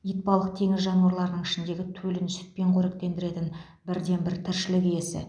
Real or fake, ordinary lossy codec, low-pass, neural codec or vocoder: real; none; 9.9 kHz; none